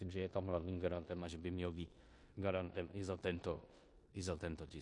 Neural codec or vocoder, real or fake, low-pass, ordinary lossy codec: codec, 16 kHz in and 24 kHz out, 0.9 kbps, LongCat-Audio-Codec, four codebook decoder; fake; 10.8 kHz; MP3, 48 kbps